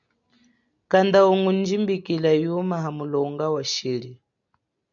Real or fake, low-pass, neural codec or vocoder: real; 7.2 kHz; none